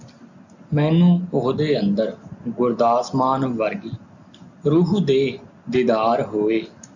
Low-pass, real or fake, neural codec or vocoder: 7.2 kHz; real; none